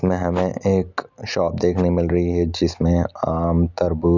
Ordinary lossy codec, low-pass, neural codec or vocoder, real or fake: none; 7.2 kHz; none; real